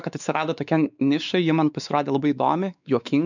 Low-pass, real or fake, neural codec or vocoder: 7.2 kHz; fake; codec, 16 kHz, 4 kbps, X-Codec, WavLM features, trained on Multilingual LibriSpeech